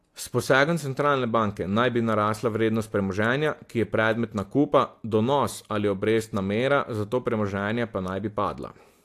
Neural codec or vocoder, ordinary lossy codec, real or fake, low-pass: none; AAC, 64 kbps; real; 14.4 kHz